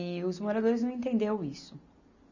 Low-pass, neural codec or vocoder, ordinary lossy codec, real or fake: 7.2 kHz; none; none; real